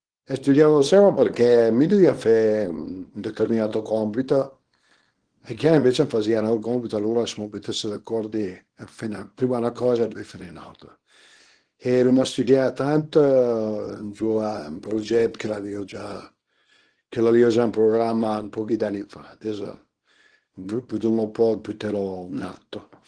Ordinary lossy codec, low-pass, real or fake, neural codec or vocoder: Opus, 16 kbps; 9.9 kHz; fake; codec, 24 kHz, 0.9 kbps, WavTokenizer, small release